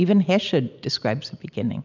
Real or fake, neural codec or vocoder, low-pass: real; none; 7.2 kHz